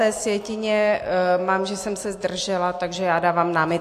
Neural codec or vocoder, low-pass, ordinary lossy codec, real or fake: none; 14.4 kHz; AAC, 64 kbps; real